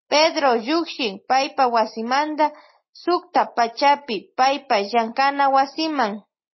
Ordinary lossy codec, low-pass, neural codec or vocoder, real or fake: MP3, 24 kbps; 7.2 kHz; none; real